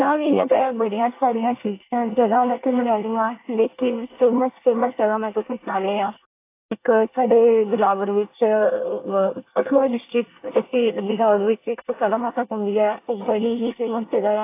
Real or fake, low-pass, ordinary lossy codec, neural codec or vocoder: fake; 3.6 kHz; AAC, 24 kbps; codec, 24 kHz, 1 kbps, SNAC